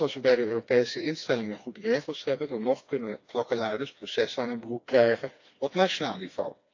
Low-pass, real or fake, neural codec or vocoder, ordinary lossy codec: 7.2 kHz; fake; codec, 16 kHz, 2 kbps, FreqCodec, smaller model; AAC, 48 kbps